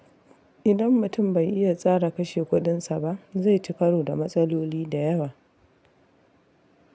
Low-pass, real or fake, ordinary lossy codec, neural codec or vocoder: none; real; none; none